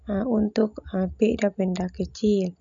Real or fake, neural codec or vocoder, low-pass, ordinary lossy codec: real; none; 7.2 kHz; none